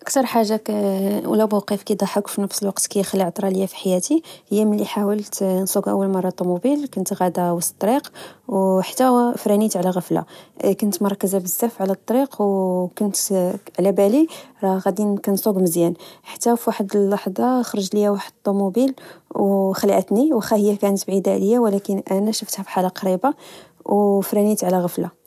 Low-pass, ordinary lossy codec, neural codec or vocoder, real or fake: 14.4 kHz; none; none; real